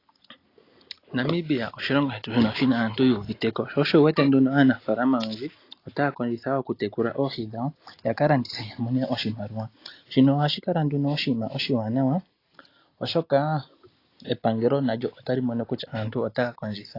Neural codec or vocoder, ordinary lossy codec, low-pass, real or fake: none; AAC, 32 kbps; 5.4 kHz; real